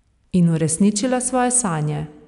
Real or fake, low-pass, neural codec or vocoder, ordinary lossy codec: real; 10.8 kHz; none; none